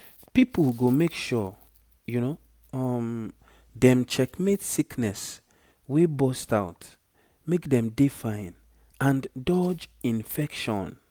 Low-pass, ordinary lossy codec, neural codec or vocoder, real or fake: none; none; none; real